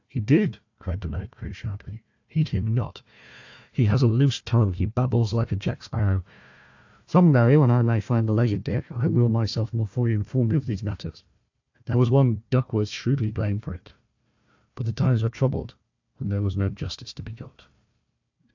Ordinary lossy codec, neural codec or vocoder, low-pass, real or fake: AAC, 48 kbps; codec, 16 kHz, 1 kbps, FunCodec, trained on Chinese and English, 50 frames a second; 7.2 kHz; fake